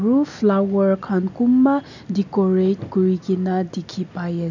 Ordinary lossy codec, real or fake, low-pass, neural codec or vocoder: none; real; 7.2 kHz; none